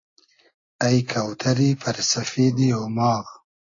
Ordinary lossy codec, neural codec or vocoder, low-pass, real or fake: AAC, 32 kbps; none; 7.2 kHz; real